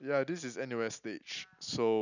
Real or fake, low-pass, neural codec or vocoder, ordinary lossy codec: real; 7.2 kHz; none; none